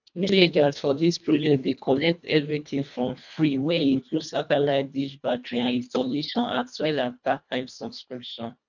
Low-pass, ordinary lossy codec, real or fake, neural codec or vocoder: 7.2 kHz; none; fake; codec, 24 kHz, 1.5 kbps, HILCodec